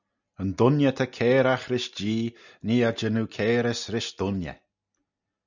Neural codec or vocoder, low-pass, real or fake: none; 7.2 kHz; real